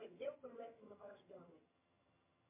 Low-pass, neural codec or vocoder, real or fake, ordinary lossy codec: 3.6 kHz; vocoder, 22.05 kHz, 80 mel bands, HiFi-GAN; fake; MP3, 24 kbps